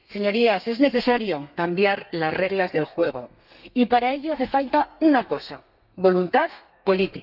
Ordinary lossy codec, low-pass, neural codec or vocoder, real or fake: none; 5.4 kHz; codec, 32 kHz, 1.9 kbps, SNAC; fake